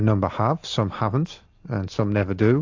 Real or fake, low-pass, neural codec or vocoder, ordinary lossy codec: real; 7.2 kHz; none; AAC, 48 kbps